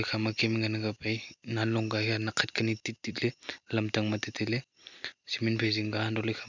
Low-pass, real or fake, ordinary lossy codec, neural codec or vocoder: 7.2 kHz; real; none; none